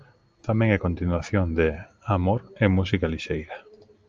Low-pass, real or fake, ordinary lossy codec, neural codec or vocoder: 7.2 kHz; real; Opus, 24 kbps; none